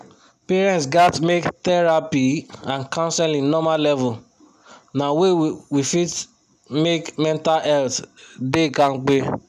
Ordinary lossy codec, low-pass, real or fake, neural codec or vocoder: none; 14.4 kHz; real; none